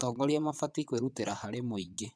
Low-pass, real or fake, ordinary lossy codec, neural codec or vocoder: none; fake; none; vocoder, 22.05 kHz, 80 mel bands, WaveNeXt